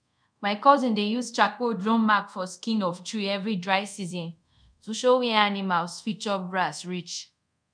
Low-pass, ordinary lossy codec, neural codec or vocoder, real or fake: 9.9 kHz; none; codec, 24 kHz, 0.5 kbps, DualCodec; fake